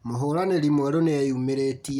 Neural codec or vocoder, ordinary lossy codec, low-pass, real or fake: none; none; 19.8 kHz; real